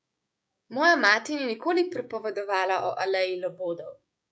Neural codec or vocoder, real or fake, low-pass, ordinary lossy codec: codec, 16 kHz, 6 kbps, DAC; fake; none; none